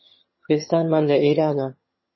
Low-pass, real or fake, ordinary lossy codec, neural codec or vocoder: 7.2 kHz; fake; MP3, 24 kbps; vocoder, 22.05 kHz, 80 mel bands, HiFi-GAN